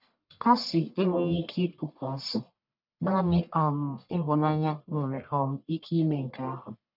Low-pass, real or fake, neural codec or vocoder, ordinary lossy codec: 5.4 kHz; fake; codec, 44.1 kHz, 1.7 kbps, Pupu-Codec; none